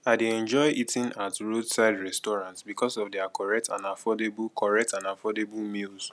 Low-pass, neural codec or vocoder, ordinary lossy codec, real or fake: none; none; none; real